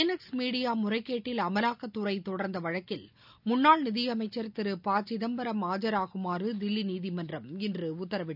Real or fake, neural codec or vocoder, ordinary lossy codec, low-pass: real; none; none; 5.4 kHz